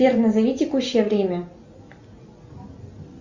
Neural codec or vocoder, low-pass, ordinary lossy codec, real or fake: none; 7.2 kHz; Opus, 64 kbps; real